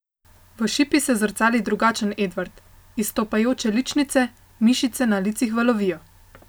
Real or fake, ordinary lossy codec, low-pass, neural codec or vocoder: real; none; none; none